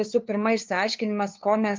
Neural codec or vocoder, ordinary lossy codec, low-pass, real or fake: codec, 16 kHz, 2 kbps, FunCodec, trained on LibriTTS, 25 frames a second; Opus, 32 kbps; 7.2 kHz; fake